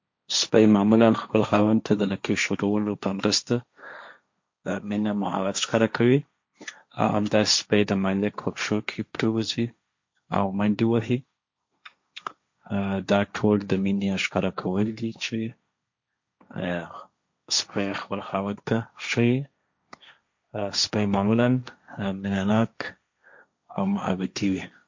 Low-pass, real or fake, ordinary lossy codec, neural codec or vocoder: 7.2 kHz; fake; MP3, 48 kbps; codec, 16 kHz, 1.1 kbps, Voila-Tokenizer